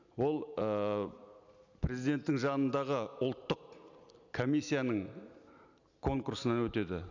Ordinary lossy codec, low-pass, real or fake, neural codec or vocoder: none; 7.2 kHz; real; none